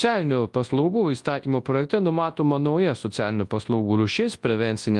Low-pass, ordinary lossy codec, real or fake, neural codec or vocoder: 10.8 kHz; Opus, 24 kbps; fake; codec, 24 kHz, 0.9 kbps, WavTokenizer, large speech release